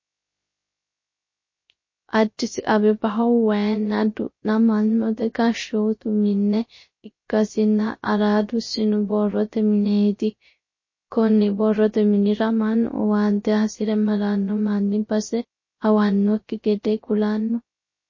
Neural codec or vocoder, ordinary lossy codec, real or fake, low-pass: codec, 16 kHz, 0.3 kbps, FocalCodec; MP3, 32 kbps; fake; 7.2 kHz